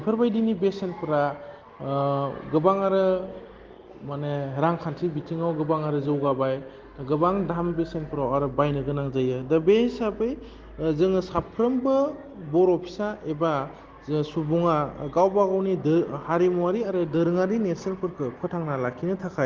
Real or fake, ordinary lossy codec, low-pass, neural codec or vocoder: real; Opus, 16 kbps; 7.2 kHz; none